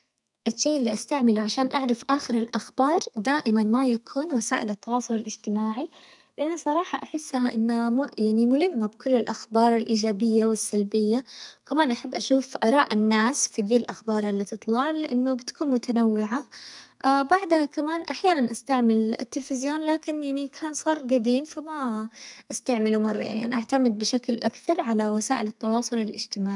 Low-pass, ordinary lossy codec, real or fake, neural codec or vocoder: 10.8 kHz; none; fake; codec, 32 kHz, 1.9 kbps, SNAC